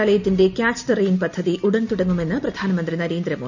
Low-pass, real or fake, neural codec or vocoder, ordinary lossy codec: 7.2 kHz; real; none; none